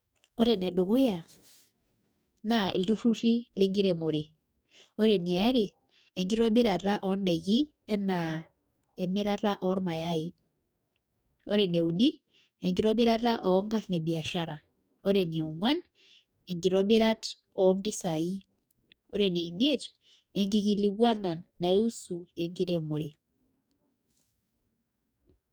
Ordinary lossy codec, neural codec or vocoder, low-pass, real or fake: none; codec, 44.1 kHz, 2.6 kbps, DAC; none; fake